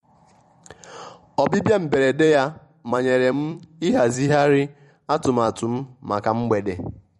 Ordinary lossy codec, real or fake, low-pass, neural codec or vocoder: MP3, 48 kbps; fake; 19.8 kHz; vocoder, 44.1 kHz, 128 mel bands every 256 samples, BigVGAN v2